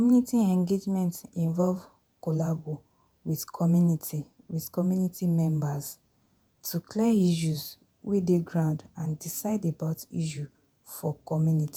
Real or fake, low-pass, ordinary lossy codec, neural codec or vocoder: fake; none; none; vocoder, 48 kHz, 128 mel bands, Vocos